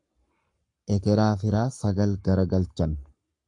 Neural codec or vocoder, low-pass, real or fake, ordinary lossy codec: codec, 44.1 kHz, 7.8 kbps, Pupu-Codec; 10.8 kHz; fake; AAC, 64 kbps